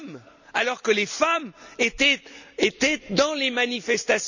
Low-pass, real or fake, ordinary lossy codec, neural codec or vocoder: 7.2 kHz; real; none; none